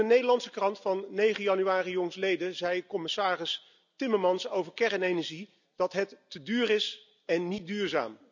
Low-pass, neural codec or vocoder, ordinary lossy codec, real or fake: 7.2 kHz; none; none; real